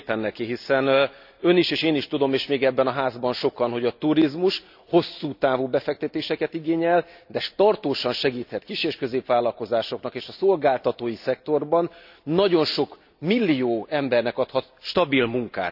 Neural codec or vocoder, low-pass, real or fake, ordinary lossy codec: none; 5.4 kHz; real; none